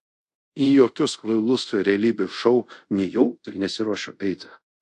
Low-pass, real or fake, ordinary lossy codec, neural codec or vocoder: 10.8 kHz; fake; AAC, 64 kbps; codec, 24 kHz, 0.5 kbps, DualCodec